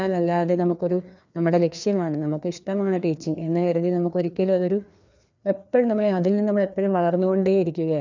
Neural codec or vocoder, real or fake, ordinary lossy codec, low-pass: codec, 16 kHz, 2 kbps, FreqCodec, larger model; fake; none; 7.2 kHz